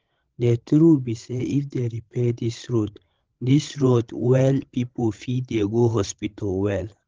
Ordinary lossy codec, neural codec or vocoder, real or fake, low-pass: Opus, 16 kbps; codec, 16 kHz, 16 kbps, FreqCodec, larger model; fake; 7.2 kHz